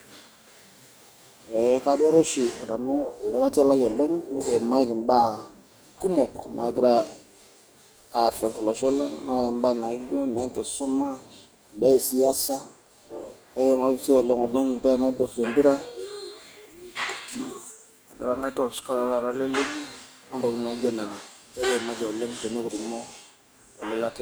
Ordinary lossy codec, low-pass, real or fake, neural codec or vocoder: none; none; fake; codec, 44.1 kHz, 2.6 kbps, DAC